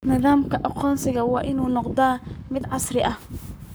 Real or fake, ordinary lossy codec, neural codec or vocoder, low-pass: fake; none; codec, 44.1 kHz, 7.8 kbps, Pupu-Codec; none